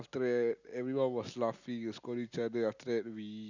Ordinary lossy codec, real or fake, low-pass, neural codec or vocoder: none; real; 7.2 kHz; none